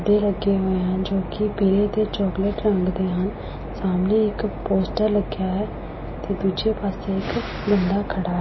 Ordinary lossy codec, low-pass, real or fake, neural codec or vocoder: MP3, 24 kbps; 7.2 kHz; real; none